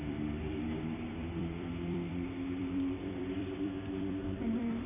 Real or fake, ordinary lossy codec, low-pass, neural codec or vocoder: fake; none; 3.6 kHz; vocoder, 44.1 kHz, 128 mel bands every 256 samples, BigVGAN v2